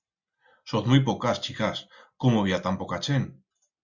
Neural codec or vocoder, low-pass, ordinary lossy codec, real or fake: none; 7.2 kHz; Opus, 64 kbps; real